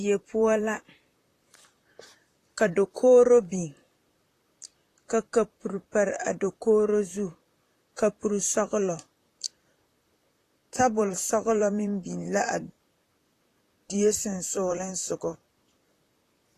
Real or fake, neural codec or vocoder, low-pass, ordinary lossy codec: fake; vocoder, 44.1 kHz, 128 mel bands, Pupu-Vocoder; 14.4 kHz; AAC, 48 kbps